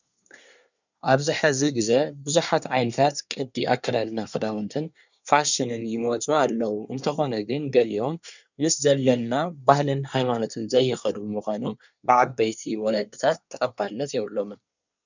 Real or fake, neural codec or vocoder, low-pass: fake; codec, 24 kHz, 1 kbps, SNAC; 7.2 kHz